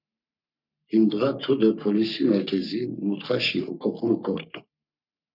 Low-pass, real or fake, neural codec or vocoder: 5.4 kHz; fake; codec, 44.1 kHz, 3.4 kbps, Pupu-Codec